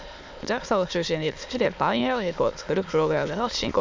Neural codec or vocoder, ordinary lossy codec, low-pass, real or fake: autoencoder, 22.05 kHz, a latent of 192 numbers a frame, VITS, trained on many speakers; MP3, 64 kbps; 7.2 kHz; fake